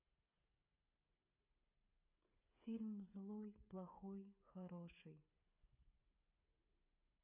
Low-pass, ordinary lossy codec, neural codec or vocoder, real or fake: 3.6 kHz; none; codec, 16 kHz, 8 kbps, FreqCodec, smaller model; fake